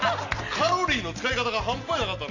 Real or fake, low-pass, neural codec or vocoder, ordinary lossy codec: real; 7.2 kHz; none; none